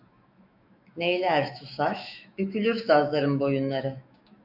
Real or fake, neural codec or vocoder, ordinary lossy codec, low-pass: fake; autoencoder, 48 kHz, 128 numbers a frame, DAC-VAE, trained on Japanese speech; MP3, 48 kbps; 5.4 kHz